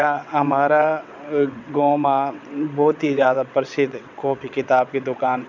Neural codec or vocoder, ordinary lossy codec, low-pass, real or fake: vocoder, 22.05 kHz, 80 mel bands, WaveNeXt; none; 7.2 kHz; fake